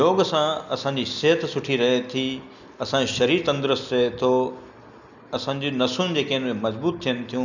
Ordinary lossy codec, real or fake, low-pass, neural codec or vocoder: none; real; 7.2 kHz; none